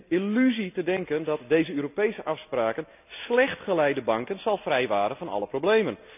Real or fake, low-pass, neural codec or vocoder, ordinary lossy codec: real; 3.6 kHz; none; none